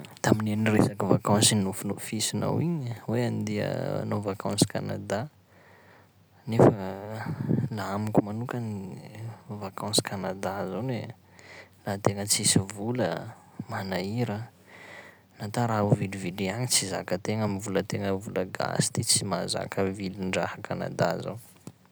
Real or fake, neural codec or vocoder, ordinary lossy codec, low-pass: real; none; none; none